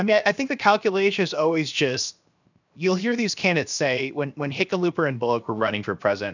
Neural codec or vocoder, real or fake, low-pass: codec, 16 kHz, 0.7 kbps, FocalCodec; fake; 7.2 kHz